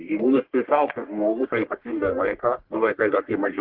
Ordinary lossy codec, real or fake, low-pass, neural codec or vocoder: Opus, 16 kbps; fake; 5.4 kHz; codec, 44.1 kHz, 1.7 kbps, Pupu-Codec